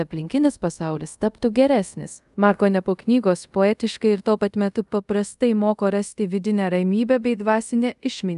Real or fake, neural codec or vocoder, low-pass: fake; codec, 24 kHz, 0.5 kbps, DualCodec; 10.8 kHz